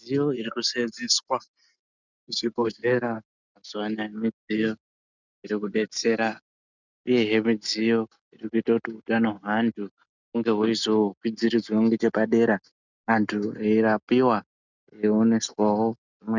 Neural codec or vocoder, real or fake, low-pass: none; real; 7.2 kHz